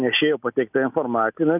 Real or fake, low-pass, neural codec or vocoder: real; 3.6 kHz; none